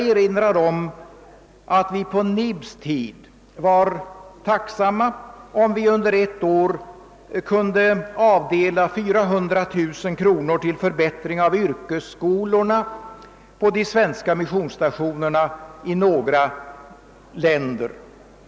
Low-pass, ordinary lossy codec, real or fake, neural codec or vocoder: none; none; real; none